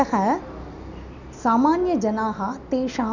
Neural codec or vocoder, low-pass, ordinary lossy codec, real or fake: none; 7.2 kHz; none; real